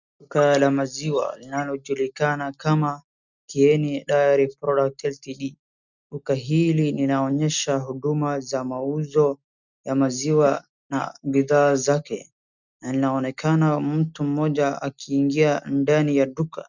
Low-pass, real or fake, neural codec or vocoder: 7.2 kHz; real; none